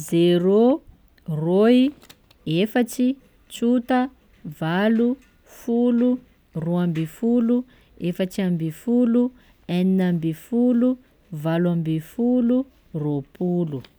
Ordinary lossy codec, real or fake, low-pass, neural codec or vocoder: none; real; none; none